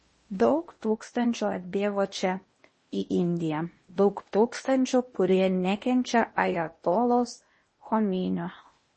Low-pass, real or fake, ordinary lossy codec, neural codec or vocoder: 10.8 kHz; fake; MP3, 32 kbps; codec, 16 kHz in and 24 kHz out, 0.8 kbps, FocalCodec, streaming, 65536 codes